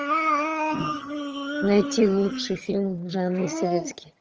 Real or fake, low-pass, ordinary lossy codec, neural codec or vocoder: fake; 7.2 kHz; Opus, 24 kbps; vocoder, 22.05 kHz, 80 mel bands, HiFi-GAN